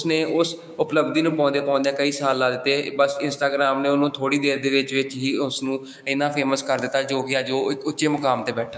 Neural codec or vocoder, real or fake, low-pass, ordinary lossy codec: codec, 16 kHz, 6 kbps, DAC; fake; none; none